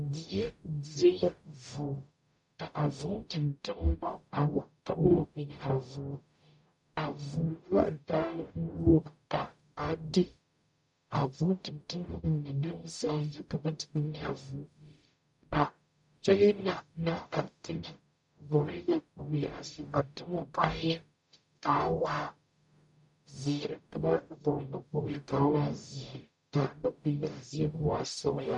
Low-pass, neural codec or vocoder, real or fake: 10.8 kHz; codec, 44.1 kHz, 0.9 kbps, DAC; fake